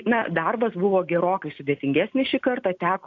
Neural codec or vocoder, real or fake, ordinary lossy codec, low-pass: none; real; AAC, 48 kbps; 7.2 kHz